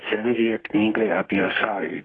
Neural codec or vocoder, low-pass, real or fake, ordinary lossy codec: codec, 32 kHz, 1.9 kbps, SNAC; 9.9 kHz; fake; AAC, 32 kbps